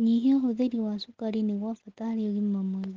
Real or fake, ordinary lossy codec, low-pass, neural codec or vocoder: real; Opus, 16 kbps; 7.2 kHz; none